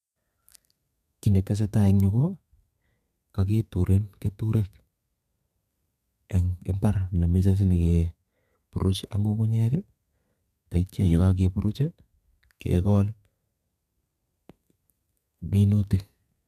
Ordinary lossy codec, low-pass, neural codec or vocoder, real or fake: none; 14.4 kHz; codec, 32 kHz, 1.9 kbps, SNAC; fake